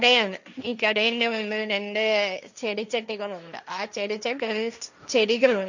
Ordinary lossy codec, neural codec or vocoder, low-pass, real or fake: none; codec, 16 kHz, 1.1 kbps, Voila-Tokenizer; 7.2 kHz; fake